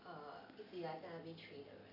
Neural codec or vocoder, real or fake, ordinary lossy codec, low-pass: none; real; none; 5.4 kHz